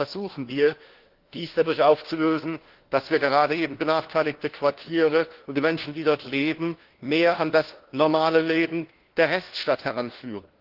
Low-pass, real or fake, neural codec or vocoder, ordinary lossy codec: 5.4 kHz; fake; codec, 16 kHz, 1 kbps, FunCodec, trained on LibriTTS, 50 frames a second; Opus, 16 kbps